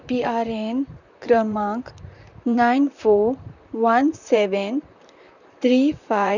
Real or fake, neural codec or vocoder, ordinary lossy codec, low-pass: fake; vocoder, 44.1 kHz, 128 mel bands, Pupu-Vocoder; none; 7.2 kHz